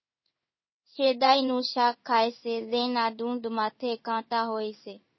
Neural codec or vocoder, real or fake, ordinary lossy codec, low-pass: codec, 16 kHz in and 24 kHz out, 1 kbps, XY-Tokenizer; fake; MP3, 24 kbps; 7.2 kHz